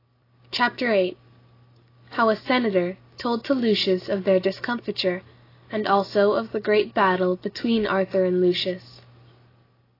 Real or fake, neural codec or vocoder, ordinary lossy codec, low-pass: real; none; AAC, 24 kbps; 5.4 kHz